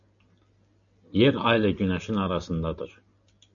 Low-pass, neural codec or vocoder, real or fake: 7.2 kHz; none; real